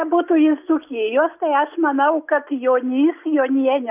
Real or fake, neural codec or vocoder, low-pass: real; none; 3.6 kHz